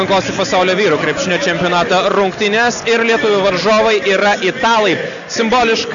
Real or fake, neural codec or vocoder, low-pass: real; none; 7.2 kHz